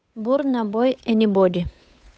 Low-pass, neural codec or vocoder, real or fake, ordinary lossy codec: none; codec, 16 kHz, 8 kbps, FunCodec, trained on Chinese and English, 25 frames a second; fake; none